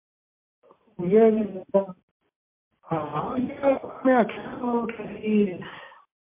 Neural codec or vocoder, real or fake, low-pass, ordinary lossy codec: none; real; 3.6 kHz; MP3, 24 kbps